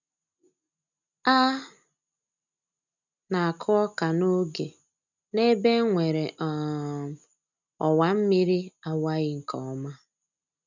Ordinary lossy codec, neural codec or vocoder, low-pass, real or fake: none; none; 7.2 kHz; real